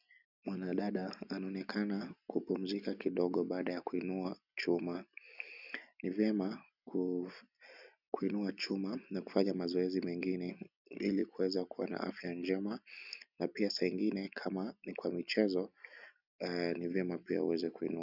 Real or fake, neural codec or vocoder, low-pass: real; none; 5.4 kHz